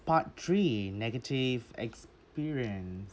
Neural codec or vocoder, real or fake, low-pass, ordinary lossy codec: none; real; none; none